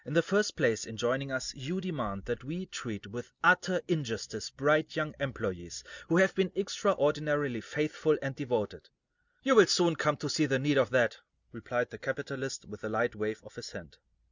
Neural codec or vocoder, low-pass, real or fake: none; 7.2 kHz; real